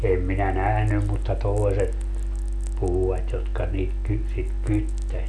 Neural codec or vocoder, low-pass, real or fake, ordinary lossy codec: none; none; real; none